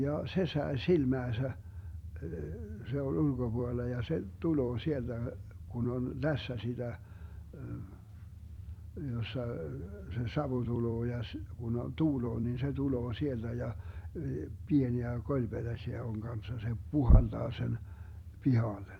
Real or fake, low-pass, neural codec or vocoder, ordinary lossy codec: fake; 19.8 kHz; vocoder, 44.1 kHz, 128 mel bands every 256 samples, BigVGAN v2; none